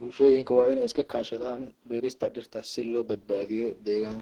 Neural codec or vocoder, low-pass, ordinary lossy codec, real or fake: codec, 44.1 kHz, 2.6 kbps, DAC; 19.8 kHz; Opus, 16 kbps; fake